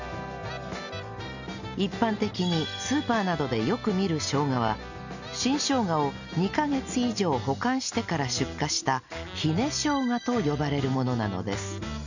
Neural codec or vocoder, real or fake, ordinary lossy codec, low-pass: none; real; none; 7.2 kHz